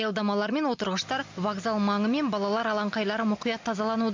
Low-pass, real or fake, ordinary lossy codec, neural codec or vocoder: 7.2 kHz; real; MP3, 64 kbps; none